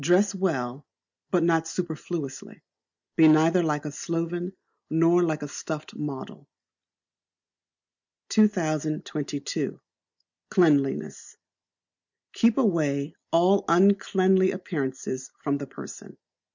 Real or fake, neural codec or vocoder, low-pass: real; none; 7.2 kHz